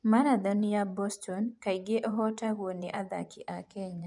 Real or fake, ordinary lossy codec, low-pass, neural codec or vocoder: fake; none; 10.8 kHz; vocoder, 24 kHz, 100 mel bands, Vocos